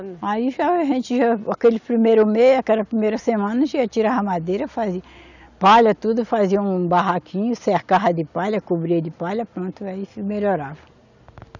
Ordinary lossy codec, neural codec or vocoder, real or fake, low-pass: none; none; real; 7.2 kHz